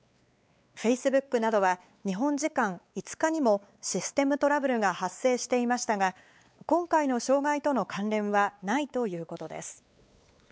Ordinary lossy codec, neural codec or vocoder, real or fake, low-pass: none; codec, 16 kHz, 4 kbps, X-Codec, WavLM features, trained on Multilingual LibriSpeech; fake; none